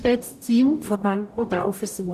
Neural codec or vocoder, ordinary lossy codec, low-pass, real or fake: codec, 44.1 kHz, 0.9 kbps, DAC; none; 14.4 kHz; fake